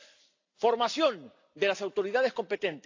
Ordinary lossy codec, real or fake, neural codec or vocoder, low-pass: AAC, 48 kbps; real; none; 7.2 kHz